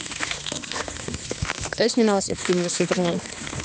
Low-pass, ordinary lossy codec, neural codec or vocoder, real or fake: none; none; codec, 16 kHz, 2 kbps, X-Codec, HuBERT features, trained on balanced general audio; fake